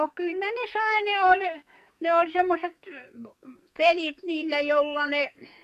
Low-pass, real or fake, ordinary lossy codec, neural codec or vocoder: 14.4 kHz; fake; none; codec, 44.1 kHz, 2.6 kbps, SNAC